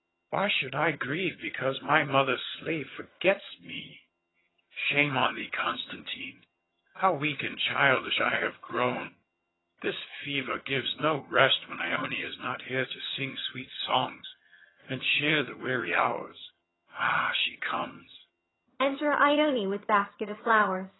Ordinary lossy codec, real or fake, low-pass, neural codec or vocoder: AAC, 16 kbps; fake; 7.2 kHz; vocoder, 22.05 kHz, 80 mel bands, HiFi-GAN